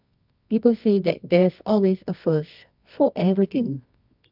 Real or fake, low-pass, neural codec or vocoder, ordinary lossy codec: fake; 5.4 kHz; codec, 24 kHz, 0.9 kbps, WavTokenizer, medium music audio release; none